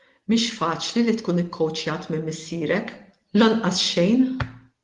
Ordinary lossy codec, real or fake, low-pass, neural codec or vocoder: Opus, 24 kbps; real; 10.8 kHz; none